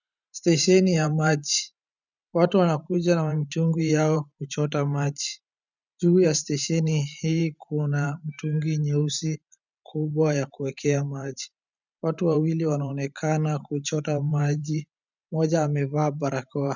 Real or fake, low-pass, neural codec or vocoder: fake; 7.2 kHz; vocoder, 44.1 kHz, 128 mel bands every 512 samples, BigVGAN v2